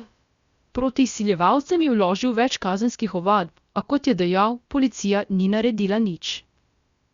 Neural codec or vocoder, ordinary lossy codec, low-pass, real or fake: codec, 16 kHz, about 1 kbps, DyCAST, with the encoder's durations; Opus, 64 kbps; 7.2 kHz; fake